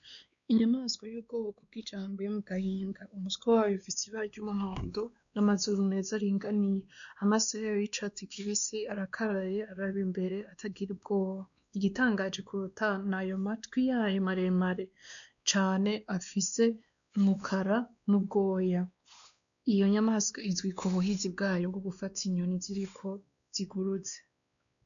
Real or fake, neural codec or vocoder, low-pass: fake; codec, 16 kHz, 2 kbps, X-Codec, WavLM features, trained on Multilingual LibriSpeech; 7.2 kHz